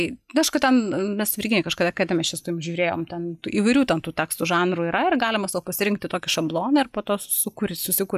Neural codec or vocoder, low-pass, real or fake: none; 14.4 kHz; real